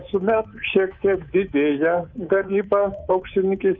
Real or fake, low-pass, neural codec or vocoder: fake; 7.2 kHz; codec, 24 kHz, 3.1 kbps, DualCodec